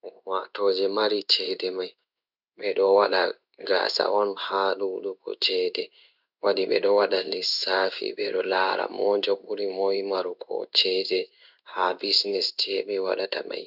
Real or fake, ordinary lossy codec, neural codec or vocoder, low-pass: fake; none; codec, 16 kHz in and 24 kHz out, 1 kbps, XY-Tokenizer; 5.4 kHz